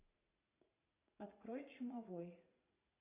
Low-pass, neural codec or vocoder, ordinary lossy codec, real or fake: 3.6 kHz; vocoder, 44.1 kHz, 80 mel bands, Vocos; AAC, 24 kbps; fake